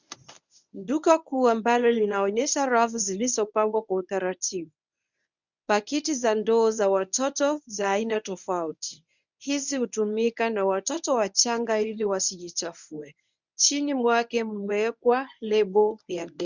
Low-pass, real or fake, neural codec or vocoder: 7.2 kHz; fake; codec, 24 kHz, 0.9 kbps, WavTokenizer, medium speech release version 1